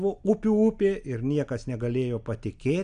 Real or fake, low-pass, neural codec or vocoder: real; 9.9 kHz; none